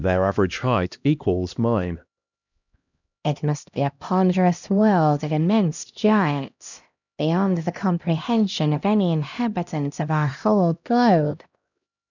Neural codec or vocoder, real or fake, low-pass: codec, 16 kHz, 1 kbps, X-Codec, HuBERT features, trained on LibriSpeech; fake; 7.2 kHz